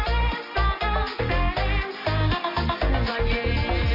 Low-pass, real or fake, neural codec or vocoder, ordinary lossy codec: 5.4 kHz; real; none; none